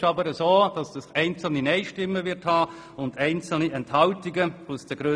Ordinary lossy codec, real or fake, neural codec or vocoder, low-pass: none; real; none; none